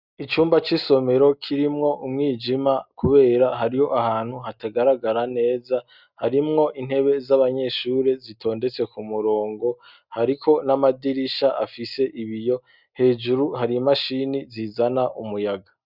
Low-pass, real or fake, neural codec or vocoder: 5.4 kHz; real; none